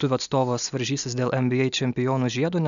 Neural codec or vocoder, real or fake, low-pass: none; real; 7.2 kHz